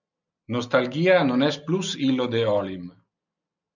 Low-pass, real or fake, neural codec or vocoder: 7.2 kHz; real; none